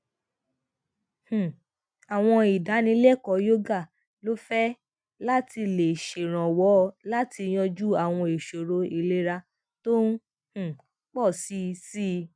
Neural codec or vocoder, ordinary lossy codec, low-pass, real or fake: none; none; 9.9 kHz; real